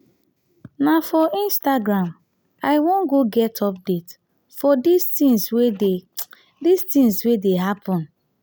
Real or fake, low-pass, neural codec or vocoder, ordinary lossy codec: real; none; none; none